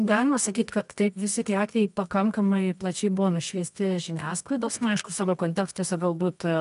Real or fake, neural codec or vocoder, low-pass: fake; codec, 24 kHz, 0.9 kbps, WavTokenizer, medium music audio release; 10.8 kHz